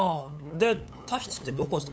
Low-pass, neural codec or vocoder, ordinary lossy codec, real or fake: none; codec, 16 kHz, 8 kbps, FunCodec, trained on LibriTTS, 25 frames a second; none; fake